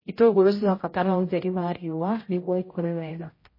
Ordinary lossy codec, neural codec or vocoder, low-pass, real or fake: MP3, 24 kbps; codec, 16 kHz, 0.5 kbps, X-Codec, HuBERT features, trained on general audio; 5.4 kHz; fake